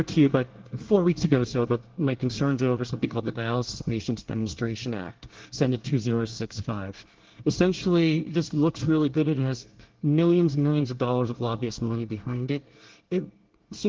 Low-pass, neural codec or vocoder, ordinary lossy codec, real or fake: 7.2 kHz; codec, 24 kHz, 1 kbps, SNAC; Opus, 16 kbps; fake